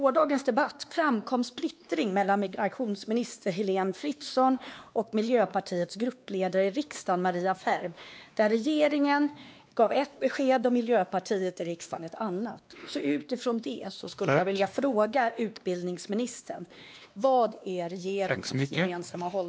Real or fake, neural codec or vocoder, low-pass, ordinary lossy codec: fake; codec, 16 kHz, 2 kbps, X-Codec, WavLM features, trained on Multilingual LibriSpeech; none; none